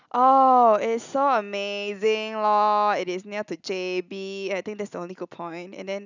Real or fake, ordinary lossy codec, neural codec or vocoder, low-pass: real; none; none; 7.2 kHz